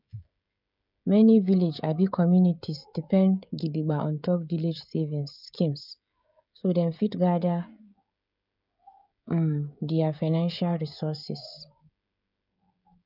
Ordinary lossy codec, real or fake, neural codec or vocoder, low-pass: none; fake; codec, 16 kHz, 16 kbps, FreqCodec, smaller model; 5.4 kHz